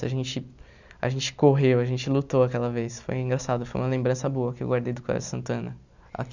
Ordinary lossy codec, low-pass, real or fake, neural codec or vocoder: none; 7.2 kHz; real; none